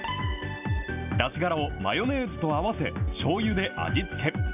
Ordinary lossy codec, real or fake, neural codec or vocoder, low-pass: none; real; none; 3.6 kHz